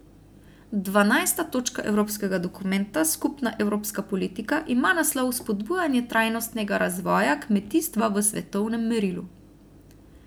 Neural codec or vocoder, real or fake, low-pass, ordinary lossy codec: none; real; none; none